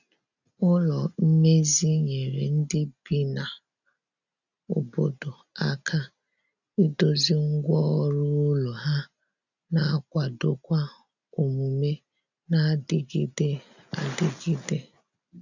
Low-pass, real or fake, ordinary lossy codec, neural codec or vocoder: 7.2 kHz; real; none; none